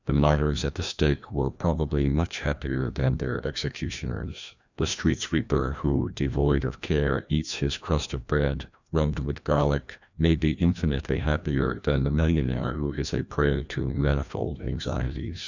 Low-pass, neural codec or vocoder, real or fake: 7.2 kHz; codec, 16 kHz, 1 kbps, FreqCodec, larger model; fake